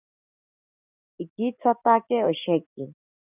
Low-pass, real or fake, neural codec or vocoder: 3.6 kHz; real; none